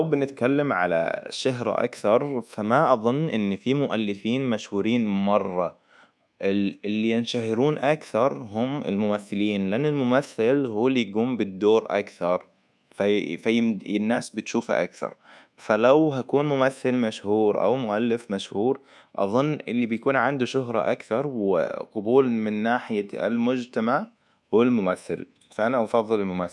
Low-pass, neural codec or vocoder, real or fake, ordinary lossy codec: none; codec, 24 kHz, 1.2 kbps, DualCodec; fake; none